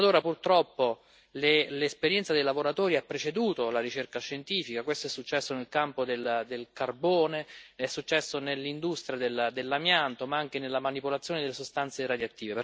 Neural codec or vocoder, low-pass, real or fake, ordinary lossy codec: none; none; real; none